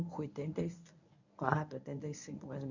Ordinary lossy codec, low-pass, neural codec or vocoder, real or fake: none; 7.2 kHz; codec, 24 kHz, 0.9 kbps, WavTokenizer, medium speech release version 1; fake